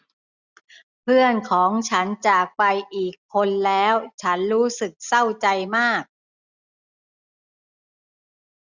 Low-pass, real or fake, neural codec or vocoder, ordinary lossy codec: 7.2 kHz; real; none; none